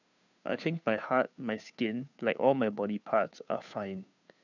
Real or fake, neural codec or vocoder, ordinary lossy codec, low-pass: fake; codec, 16 kHz, 2 kbps, FunCodec, trained on Chinese and English, 25 frames a second; none; 7.2 kHz